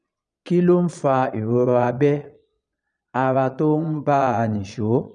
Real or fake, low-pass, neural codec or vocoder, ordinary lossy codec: fake; 9.9 kHz; vocoder, 22.05 kHz, 80 mel bands, Vocos; none